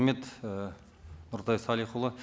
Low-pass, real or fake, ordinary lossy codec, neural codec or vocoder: none; real; none; none